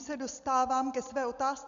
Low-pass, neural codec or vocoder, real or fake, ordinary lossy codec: 7.2 kHz; none; real; AAC, 96 kbps